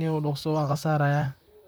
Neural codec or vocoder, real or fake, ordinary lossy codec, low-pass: vocoder, 44.1 kHz, 128 mel bands, Pupu-Vocoder; fake; none; none